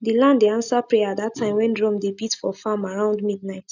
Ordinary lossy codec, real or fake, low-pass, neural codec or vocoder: none; real; 7.2 kHz; none